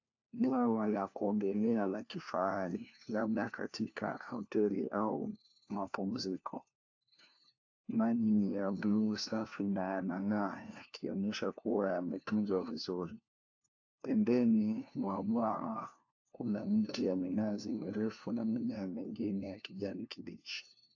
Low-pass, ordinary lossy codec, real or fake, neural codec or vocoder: 7.2 kHz; AAC, 48 kbps; fake; codec, 16 kHz, 1 kbps, FunCodec, trained on LibriTTS, 50 frames a second